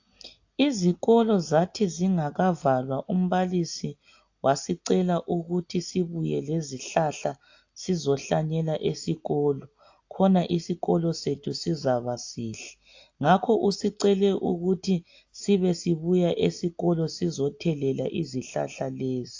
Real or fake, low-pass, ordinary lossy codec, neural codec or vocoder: real; 7.2 kHz; AAC, 48 kbps; none